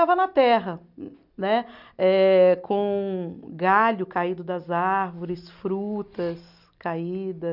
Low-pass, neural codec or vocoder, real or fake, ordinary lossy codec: 5.4 kHz; none; real; none